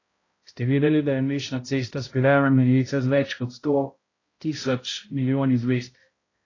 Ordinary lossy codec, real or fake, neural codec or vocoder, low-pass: AAC, 32 kbps; fake; codec, 16 kHz, 0.5 kbps, X-Codec, HuBERT features, trained on balanced general audio; 7.2 kHz